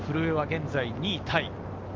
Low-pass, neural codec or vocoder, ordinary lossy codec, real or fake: 7.2 kHz; none; Opus, 32 kbps; real